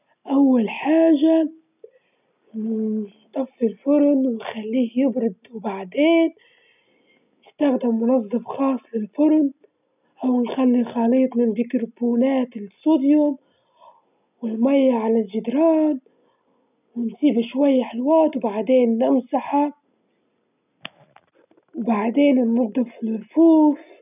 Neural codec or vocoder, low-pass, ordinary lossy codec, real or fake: none; 3.6 kHz; none; real